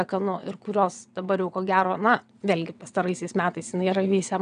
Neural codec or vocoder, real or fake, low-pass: vocoder, 22.05 kHz, 80 mel bands, WaveNeXt; fake; 9.9 kHz